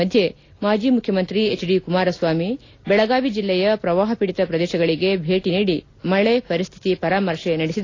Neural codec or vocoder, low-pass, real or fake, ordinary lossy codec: none; 7.2 kHz; real; AAC, 32 kbps